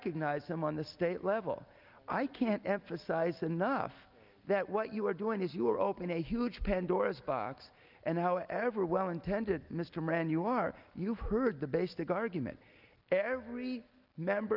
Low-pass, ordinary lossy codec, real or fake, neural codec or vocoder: 5.4 kHz; Opus, 24 kbps; real; none